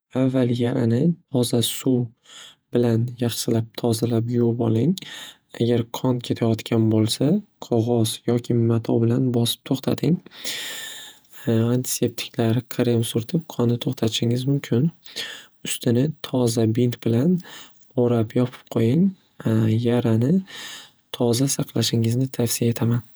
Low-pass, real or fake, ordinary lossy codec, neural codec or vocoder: none; fake; none; vocoder, 48 kHz, 128 mel bands, Vocos